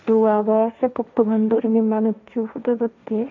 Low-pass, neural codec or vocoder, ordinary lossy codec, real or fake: 7.2 kHz; codec, 16 kHz, 1.1 kbps, Voila-Tokenizer; MP3, 64 kbps; fake